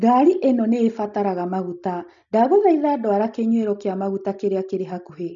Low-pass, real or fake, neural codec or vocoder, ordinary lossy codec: 7.2 kHz; real; none; none